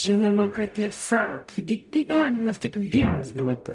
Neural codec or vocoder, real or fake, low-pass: codec, 44.1 kHz, 0.9 kbps, DAC; fake; 10.8 kHz